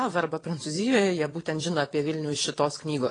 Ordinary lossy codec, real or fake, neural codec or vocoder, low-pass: AAC, 32 kbps; fake; vocoder, 22.05 kHz, 80 mel bands, WaveNeXt; 9.9 kHz